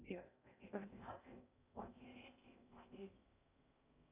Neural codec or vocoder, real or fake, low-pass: codec, 16 kHz in and 24 kHz out, 0.6 kbps, FocalCodec, streaming, 2048 codes; fake; 3.6 kHz